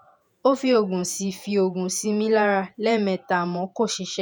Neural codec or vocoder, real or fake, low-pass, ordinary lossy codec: vocoder, 48 kHz, 128 mel bands, Vocos; fake; none; none